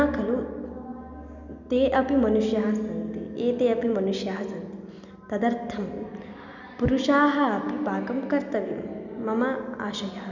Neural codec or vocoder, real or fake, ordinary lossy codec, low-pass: none; real; none; 7.2 kHz